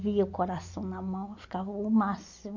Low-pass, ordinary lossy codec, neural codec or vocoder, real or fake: 7.2 kHz; MP3, 48 kbps; none; real